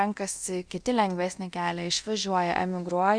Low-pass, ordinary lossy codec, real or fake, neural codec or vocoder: 9.9 kHz; Opus, 64 kbps; fake; codec, 24 kHz, 0.9 kbps, DualCodec